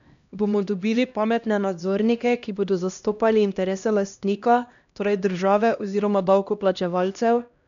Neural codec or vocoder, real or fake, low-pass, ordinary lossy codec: codec, 16 kHz, 1 kbps, X-Codec, HuBERT features, trained on LibriSpeech; fake; 7.2 kHz; none